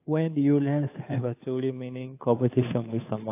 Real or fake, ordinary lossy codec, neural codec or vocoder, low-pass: fake; AAC, 24 kbps; codec, 16 kHz, 2 kbps, FunCodec, trained on Chinese and English, 25 frames a second; 3.6 kHz